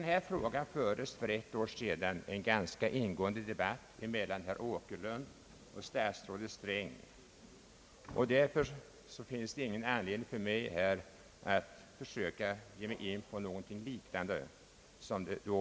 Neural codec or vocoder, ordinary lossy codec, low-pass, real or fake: none; none; none; real